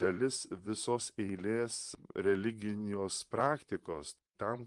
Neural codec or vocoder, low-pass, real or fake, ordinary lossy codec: vocoder, 44.1 kHz, 128 mel bands, Pupu-Vocoder; 10.8 kHz; fake; Opus, 32 kbps